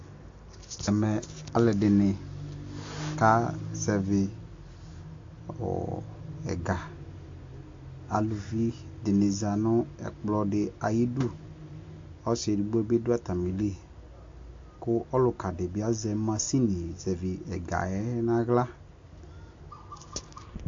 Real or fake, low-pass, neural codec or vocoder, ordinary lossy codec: real; 7.2 kHz; none; AAC, 48 kbps